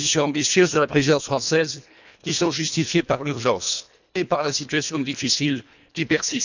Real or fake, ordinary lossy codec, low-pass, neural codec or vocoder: fake; none; 7.2 kHz; codec, 24 kHz, 1.5 kbps, HILCodec